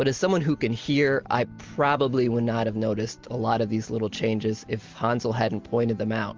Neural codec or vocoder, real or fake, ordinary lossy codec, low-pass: none; real; Opus, 32 kbps; 7.2 kHz